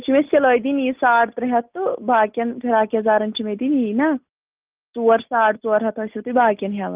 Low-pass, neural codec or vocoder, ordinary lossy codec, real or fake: 3.6 kHz; none; Opus, 32 kbps; real